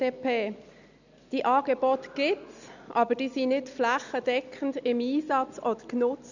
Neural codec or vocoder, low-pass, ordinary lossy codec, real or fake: none; 7.2 kHz; none; real